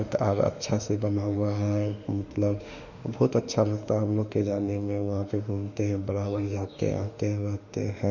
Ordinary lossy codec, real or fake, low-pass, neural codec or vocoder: none; fake; 7.2 kHz; autoencoder, 48 kHz, 32 numbers a frame, DAC-VAE, trained on Japanese speech